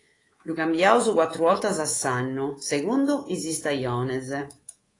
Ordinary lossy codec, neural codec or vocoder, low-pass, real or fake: AAC, 32 kbps; codec, 24 kHz, 3.1 kbps, DualCodec; 10.8 kHz; fake